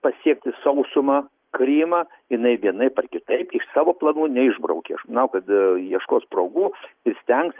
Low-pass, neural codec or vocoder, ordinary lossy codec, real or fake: 3.6 kHz; none; Opus, 24 kbps; real